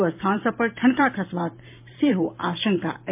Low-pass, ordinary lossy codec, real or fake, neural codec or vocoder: 3.6 kHz; MP3, 32 kbps; fake; vocoder, 44.1 kHz, 128 mel bands every 512 samples, BigVGAN v2